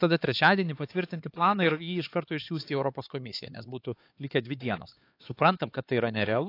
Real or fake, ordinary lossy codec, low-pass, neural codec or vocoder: fake; AAC, 32 kbps; 5.4 kHz; codec, 16 kHz, 4 kbps, X-Codec, HuBERT features, trained on LibriSpeech